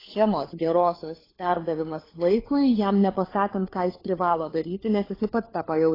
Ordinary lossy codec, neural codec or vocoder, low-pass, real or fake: AAC, 24 kbps; codec, 16 kHz, 4 kbps, FunCodec, trained on LibriTTS, 50 frames a second; 5.4 kHz; fake